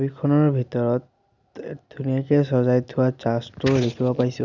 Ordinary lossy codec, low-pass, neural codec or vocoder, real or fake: none; 7.2 kHz; none; real